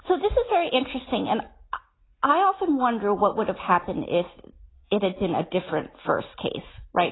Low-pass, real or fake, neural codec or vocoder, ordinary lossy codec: 7.2 kHz; real; none; AAC, 16 kbps